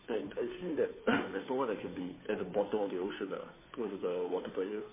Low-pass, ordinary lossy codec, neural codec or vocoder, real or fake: 3.6 kHz; MP3, 16 kbps; codec, 16 kHz, 2 kbps, FunCodec, trained on Chinese and English, 25 frames a second; fake